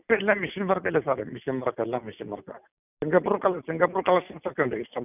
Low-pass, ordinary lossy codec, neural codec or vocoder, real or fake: 3.6 kHz; none; none; real